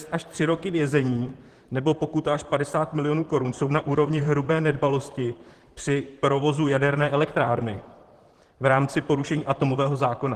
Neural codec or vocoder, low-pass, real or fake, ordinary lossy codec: vocoder, 44.1 kHz, 128 mel bands, Pupu-Vocoder; 14.4 kHz; fake; Opus, 16 kbps